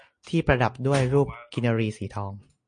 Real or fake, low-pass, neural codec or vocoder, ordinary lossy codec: real; 9.9 kHz; none; MP3, 48 kbps